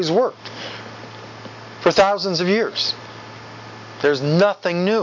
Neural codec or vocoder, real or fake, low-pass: none; real; 7.2 kHz